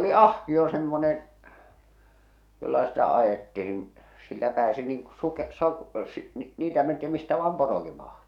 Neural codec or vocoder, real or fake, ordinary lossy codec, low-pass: codec, 44.1 kHz, 7.8 kbps, DAC; fake; none; 19.8 kHz